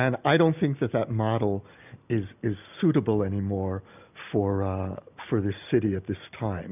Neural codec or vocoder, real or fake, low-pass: none; real; 3.6 kHz